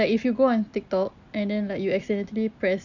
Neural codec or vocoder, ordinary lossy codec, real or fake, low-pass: none; none; real; 7.2 kHz